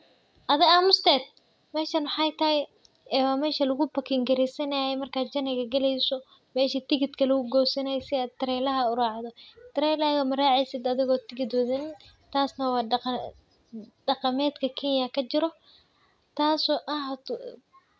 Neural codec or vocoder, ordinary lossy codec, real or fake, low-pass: none; none; real; none